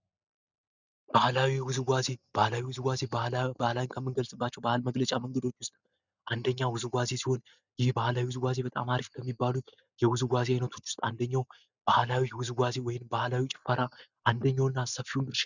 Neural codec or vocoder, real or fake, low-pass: none; real; 7.2 kHz